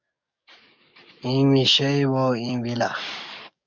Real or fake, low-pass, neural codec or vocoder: fake; 7.2 kHz; codec, 44.1 kHz, 7.8 kbps, DAC